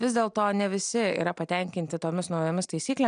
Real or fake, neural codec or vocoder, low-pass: real; none; 9.9 kHz